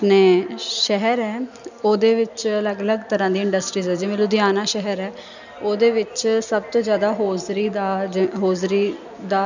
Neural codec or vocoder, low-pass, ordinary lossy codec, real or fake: none; 7.2 kHz; none; real